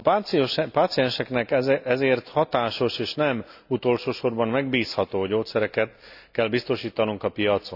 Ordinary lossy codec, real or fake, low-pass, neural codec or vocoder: none; real; 5.4 kHz; none